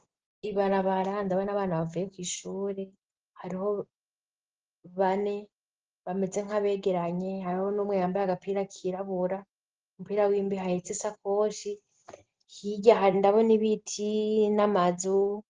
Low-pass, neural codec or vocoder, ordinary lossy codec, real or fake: 7.2 kHz; none; Opus, 16 kbps; real